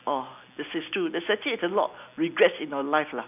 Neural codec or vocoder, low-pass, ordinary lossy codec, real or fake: none; 3.6 kHz; none; real